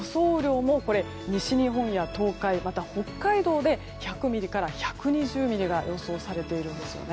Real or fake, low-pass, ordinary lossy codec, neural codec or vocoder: real; none; none; none